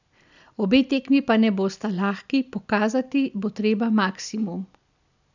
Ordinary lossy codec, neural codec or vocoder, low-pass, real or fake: none; none; 7.2 kHz; real